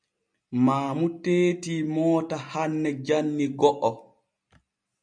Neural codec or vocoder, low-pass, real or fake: none; 9.9 kHz; real